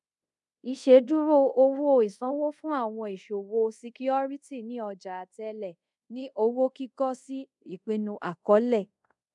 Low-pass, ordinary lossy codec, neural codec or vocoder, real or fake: 10.8 kHz; MP3, 96 kbps; codec, 24 kHz, 0.5 kbps, DualCodec; fake